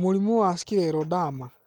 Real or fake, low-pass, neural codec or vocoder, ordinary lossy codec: real; 19.8 kHz; none; Opus, 24 kbps